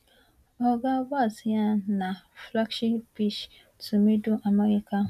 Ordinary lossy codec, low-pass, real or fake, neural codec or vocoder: none; 14.4 kHz; real; none